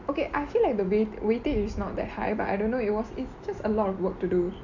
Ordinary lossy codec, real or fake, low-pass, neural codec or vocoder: none; real; 7.2 kHz; none